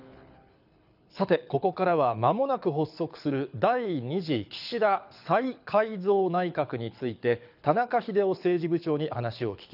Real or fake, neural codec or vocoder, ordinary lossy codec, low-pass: fake; codec, 24 kHz, 6 kbps, HILCodec; none; 5.4 kHz